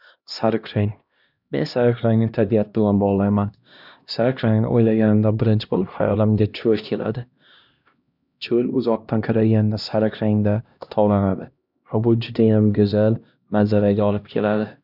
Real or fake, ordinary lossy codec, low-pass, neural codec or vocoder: fake; none; 5.4 kHz; codec, 16 kHz, 1 kbps, X-Codec, HuBERT features, trained on LibriSpeech